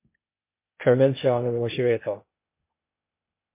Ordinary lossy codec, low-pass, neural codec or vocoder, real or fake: MP3, 24 kbps; 3.6 kHz; codec, 16 kHz, 0.8 kbps, ZipCodec; fake